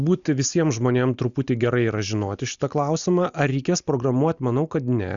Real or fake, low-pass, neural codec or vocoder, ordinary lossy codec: real; 7.2 kHz; none; Opus, 64 kbps